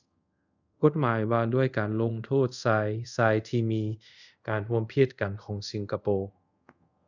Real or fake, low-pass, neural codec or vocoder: fake; 7.2 kHz; codec, 24 kHz, 0.5 kbps, DualCodec